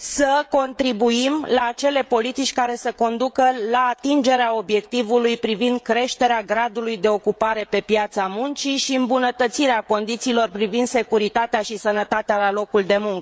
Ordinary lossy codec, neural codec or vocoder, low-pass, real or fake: none; codec, 16 kHz, 16 kbps, FreqCodec, smaller model; none; fake